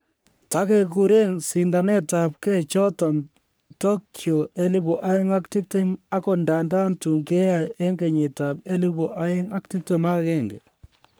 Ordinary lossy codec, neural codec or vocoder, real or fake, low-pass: none; codec, 44.1 kHz, 3.4 kbps, Pupu-Codec; fake; none